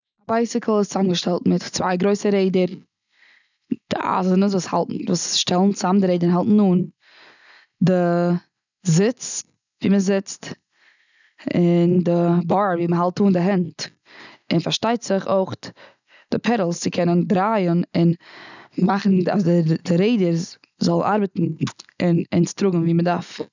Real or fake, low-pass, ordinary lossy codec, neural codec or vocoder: real; 7.2 kHz; none; none